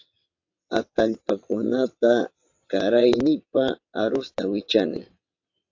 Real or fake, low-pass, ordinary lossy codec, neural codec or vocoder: fake; 7.2 kHz; AAC, 48 kbps; vocoder, 22.05 kHz, 80 mel bands, WaveNeXt